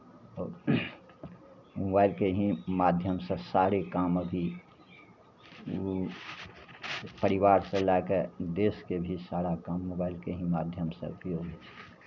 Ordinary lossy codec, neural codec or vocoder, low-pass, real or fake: none; none; 7.2 kHz; real